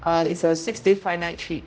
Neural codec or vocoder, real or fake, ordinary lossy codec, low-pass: codec, 16 kHz, 0.5 kbps, X-Codec, HuBERT features, trained on general audio; fake; none; none